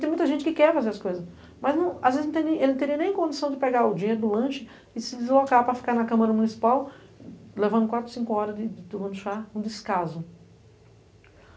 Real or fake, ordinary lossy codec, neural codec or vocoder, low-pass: real; none; none; none